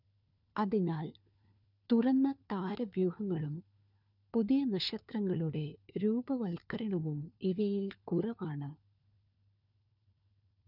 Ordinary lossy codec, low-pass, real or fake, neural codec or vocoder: none; 5.4 kHz; fake; codec, 16 kHz, 4 kbps, FunCodec, trained on LibriTTS, 50 frames a second